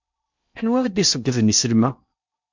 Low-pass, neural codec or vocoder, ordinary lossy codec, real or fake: 7.2 kHz; codec, 16 kHz in and 24 kHz out, 0.8 kbps, FocalCodec, streaming, 65536 codes; MP3, 64 kbps; fake